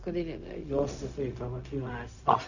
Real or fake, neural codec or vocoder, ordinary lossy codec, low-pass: fake; codec, 16 kHz, 0.4 kbps, LongCat-Audio-Codec; none; 7.2 kHz